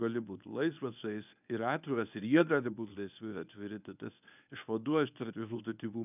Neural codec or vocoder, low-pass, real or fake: codec, 24 kHz, 0.9 kbps, WavTokenizer, medium speech release version 1; 3.6 kHz; fake